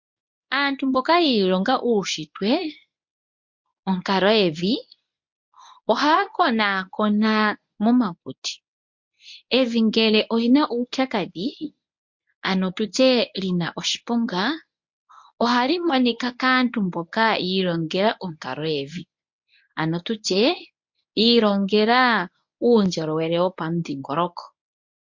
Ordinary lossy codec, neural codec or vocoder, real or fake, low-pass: MP3, 48 kbps; codec, 24 kHz, 0.9 kbps, WavTokenizer, medium speech release version 1; fake; 7.2 kHz